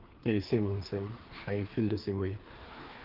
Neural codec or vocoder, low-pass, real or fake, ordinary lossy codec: codec, 16 kHz, 2 kbps, FreqCodec, larger model; 5.4 kHz; fake; Opus, 16 kbps